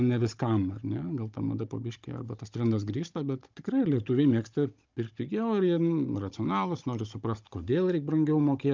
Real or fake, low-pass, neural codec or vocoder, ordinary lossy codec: fake; 7.2 kHz; codec, 16 kHz, 16 kbps, FunCodec, trained on Chinese and English, 50 frames a second; Opus, 24 kbps